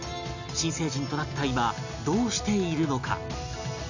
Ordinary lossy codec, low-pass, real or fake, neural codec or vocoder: none; 7.2 kHz; real; none